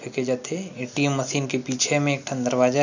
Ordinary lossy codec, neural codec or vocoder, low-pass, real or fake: none; none; 7.2 kHz; real